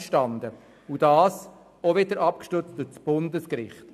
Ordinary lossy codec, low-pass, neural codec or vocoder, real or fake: AAC, 96 kbps; 14.4 kHz; none; real